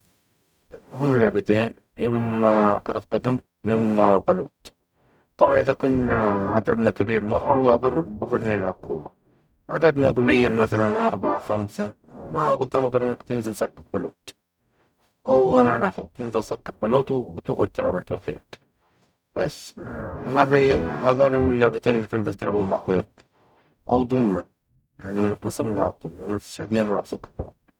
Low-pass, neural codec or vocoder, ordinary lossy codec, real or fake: 19.8 kHz; codec, 44.1 kHz, 0.9 kbps, DAC; none; fake